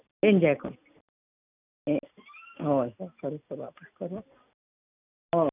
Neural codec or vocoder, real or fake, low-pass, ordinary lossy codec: none; real; 3.6 kHz; none